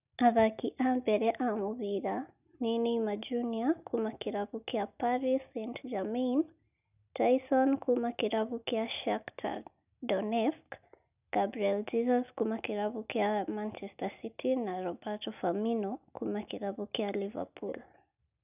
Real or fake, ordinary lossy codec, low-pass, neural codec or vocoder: real; none; 3.6 kHz; none